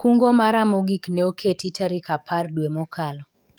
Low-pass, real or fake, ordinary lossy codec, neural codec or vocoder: none; fake; none; codec, 44.1 kHz, 7.8 kbps, DAC